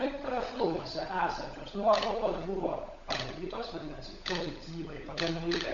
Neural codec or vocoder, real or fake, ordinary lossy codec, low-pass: codec, 16 kHz, 16 kbps, FunCodec, trained on LibriTTS, 50 frames a second; fake; MP3, 48 kbps; 7.2 kHz